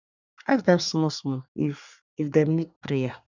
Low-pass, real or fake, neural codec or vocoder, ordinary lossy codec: 7.2 kHz; fake; codec, 24 kHz, 1 kbps, SNAC; none